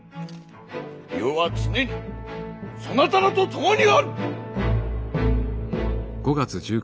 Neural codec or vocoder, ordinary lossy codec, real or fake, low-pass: none; none; real; none